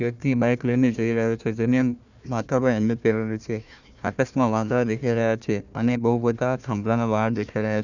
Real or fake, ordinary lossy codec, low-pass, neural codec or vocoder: fake; none; 7.2 kHz; codec, 16 kHz, 1 kbps, FunCodec, trained on Chinese and English, 50 frames a second